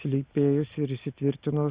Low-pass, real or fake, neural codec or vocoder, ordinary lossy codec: 3.6 kHz; real; none; Opus, 64 kbps